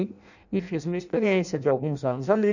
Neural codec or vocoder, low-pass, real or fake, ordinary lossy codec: codec, 16 kHz in and 24 kHz out, 0.6 kbps, FireRedTTS-2 codec; 7.2 kHz; fake; none